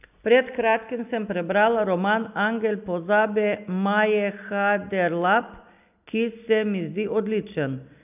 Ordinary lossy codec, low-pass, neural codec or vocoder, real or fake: none; 3.6 kHz; none; real